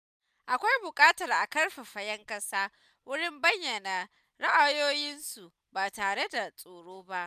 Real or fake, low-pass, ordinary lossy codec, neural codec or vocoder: real; 14.4 kHz; none; none